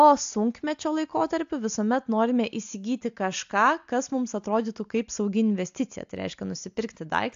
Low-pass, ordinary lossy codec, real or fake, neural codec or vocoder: 7.2 kHz; AAC, 64 kbps; real; none